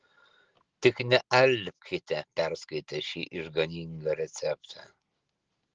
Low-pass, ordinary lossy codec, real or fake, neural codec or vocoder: 7.2 kHz; Opus, 16 kbps; real; none